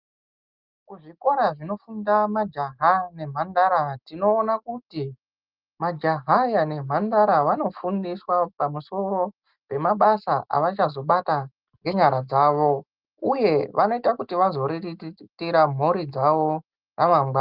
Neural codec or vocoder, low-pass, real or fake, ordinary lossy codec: none; 5.4 kHz; real; Opus, 24 kbps